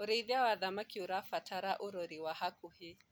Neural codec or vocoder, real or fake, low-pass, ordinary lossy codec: none; real; none; none